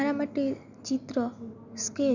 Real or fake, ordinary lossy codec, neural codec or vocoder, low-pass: real; none; none; 7.2 kHz